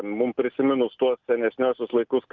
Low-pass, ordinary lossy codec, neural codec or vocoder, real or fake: 7.2 kHz; Opus, 24 kbps; none; real